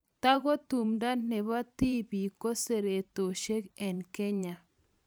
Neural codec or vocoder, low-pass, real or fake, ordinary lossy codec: vocoder, 44.1 kHz, 128 mel bands every 512 samples, BigVGAN v2; none; fake; none